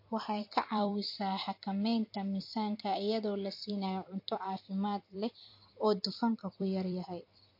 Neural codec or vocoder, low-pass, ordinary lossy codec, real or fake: vocoder, 44.1 kHz, 128 mel bands every 256 samples, BigVGAN v2; 5.4 kHz; MP3, 32 kbps; fake